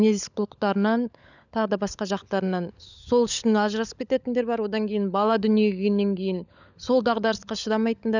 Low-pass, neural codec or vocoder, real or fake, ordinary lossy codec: 7.2 kHz; codec, 16 kHz, 16 kbps, FunCodec, trained on LibriTTS, 50 frames a second; fake; none